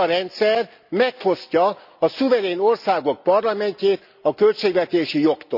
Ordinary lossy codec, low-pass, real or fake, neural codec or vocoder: none; 5.4 kHz; real; none